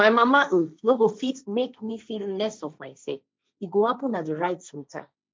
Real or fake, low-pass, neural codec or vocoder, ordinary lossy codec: fake; none; codec, 16 kHz, 1.1 kbps, Voila-Tokenizer; none